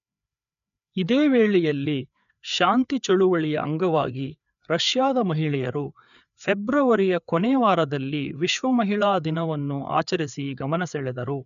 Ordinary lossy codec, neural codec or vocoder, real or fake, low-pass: none; codec, 16 kHz, 4 kbps, FreqCodec, larger model; fake; 7.2 kHz